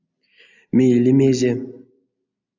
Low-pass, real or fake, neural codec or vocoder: 7.2 kHz; real; none